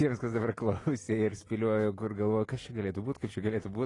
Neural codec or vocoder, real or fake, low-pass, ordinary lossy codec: none; real; 10.8 kHz; AAC, 32 kbps